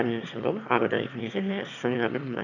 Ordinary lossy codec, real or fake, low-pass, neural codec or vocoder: none; fake; 7.2 kHz; autoencoder, 22.05 kHz, a latent of 192 numbers a frame, VITS, trained on one speaker